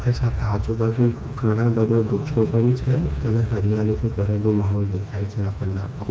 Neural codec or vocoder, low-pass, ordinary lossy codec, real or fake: codec, 16 kHz, 2 kbps, FreqCodec, smaller model; none; none; fake